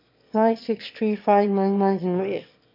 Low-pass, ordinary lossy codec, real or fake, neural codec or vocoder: 5.4 kHz; MP3, 48 kbps; fake; autoencoder, 22.05 kHz, a latent of 192 numbers a frame, VITS, trained on one speaker